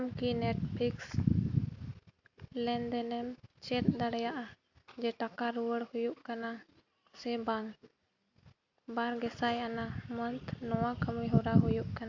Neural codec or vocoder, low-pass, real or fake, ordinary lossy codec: none; 7.2 kHz; real; AAC, 48 kbps